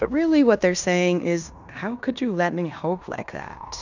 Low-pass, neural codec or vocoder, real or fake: 7.2 kHz; codec, 16 kHz in and 24 kHz out, 0.9 kbps, LongCat-Audio-Codec, fine tuned four codebook decoder; fake